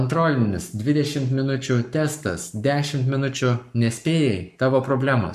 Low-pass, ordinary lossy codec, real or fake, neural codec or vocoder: 14.4 kHz; MP3, 96 kbps; fake; codec, 44.1 kHz, 7.8 kbps, Pupu-Codec